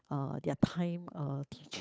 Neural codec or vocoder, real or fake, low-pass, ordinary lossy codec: codec, 16 kHz, 8 kbps, FunCodec, trained on Chinese and English, 25 frames a second; fake; none; none